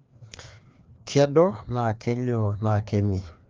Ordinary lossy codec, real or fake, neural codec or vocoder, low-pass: Opus, 32 kbps; fake; codec, 16 kHz, 2 kbps, FreqCodec, larger model; 7.2 kHz